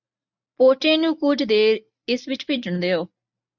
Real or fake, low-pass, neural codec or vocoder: real; 7.2 kHz; none